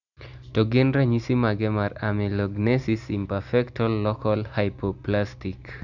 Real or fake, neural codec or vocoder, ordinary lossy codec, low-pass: real; none; none; 7.2 kHz